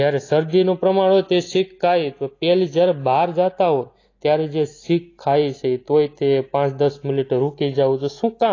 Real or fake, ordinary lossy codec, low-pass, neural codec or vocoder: real; AAC, 32 kbps; 7.2 kHz; none